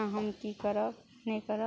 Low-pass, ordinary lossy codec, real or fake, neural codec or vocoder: none; none; real; none